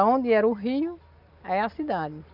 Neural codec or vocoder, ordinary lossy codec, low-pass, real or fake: none; none; 5.4 kHz; real